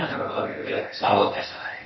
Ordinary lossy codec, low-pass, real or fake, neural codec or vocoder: MP3, 24 kbps; 7.2 kHz; fake; codec, 16 kHz in and 24 kHz out, 0.6 kbps, FocalCodec, streaming, 4096 codes